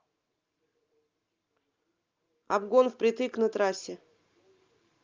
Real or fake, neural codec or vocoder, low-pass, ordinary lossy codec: real; none; 7.2 kHz; Opus, 32 kbps